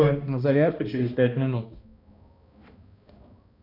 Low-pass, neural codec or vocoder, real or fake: 5.4 kHz; codec, 16 kHz, 1 kbps, X-Codec, HuBERT features, trained on balanced general audio; fake